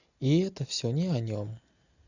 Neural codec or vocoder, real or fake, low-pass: none; real; 7.2 kHz